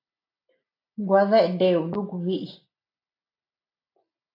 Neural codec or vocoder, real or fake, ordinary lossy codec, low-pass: none; real; AAC, 32 kbps; 5.4 kHz